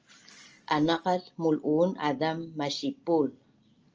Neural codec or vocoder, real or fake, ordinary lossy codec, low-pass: none; real; Opus, 24 kbps; 7.2 kHz